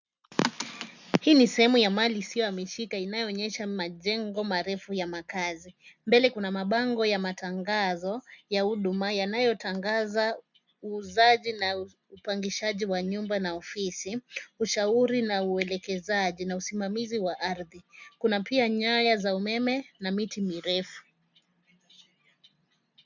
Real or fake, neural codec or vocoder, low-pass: real; none; 7.2 kHz